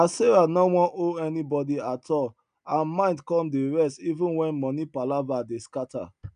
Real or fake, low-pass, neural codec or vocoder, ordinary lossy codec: real; 9.9 kHz; none; none